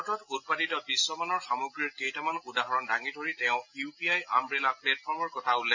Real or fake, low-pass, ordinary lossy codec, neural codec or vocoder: real; 7.2 kHz; AAC, 48 kbps; none